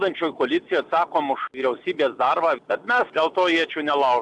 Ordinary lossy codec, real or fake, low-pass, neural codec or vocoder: AAC, 64 kbps; real; 10.8 kHz; none